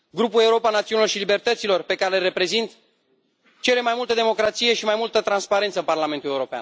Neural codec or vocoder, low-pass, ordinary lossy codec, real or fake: none; none; none; real